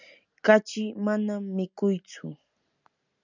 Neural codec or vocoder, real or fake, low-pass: none; real; 7.2 kHz